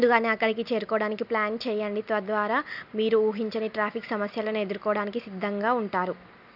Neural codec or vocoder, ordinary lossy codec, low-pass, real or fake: none; none; 5.4 kHz; real